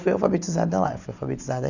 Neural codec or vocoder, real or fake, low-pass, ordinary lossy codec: none; real; 7.2 kHz; none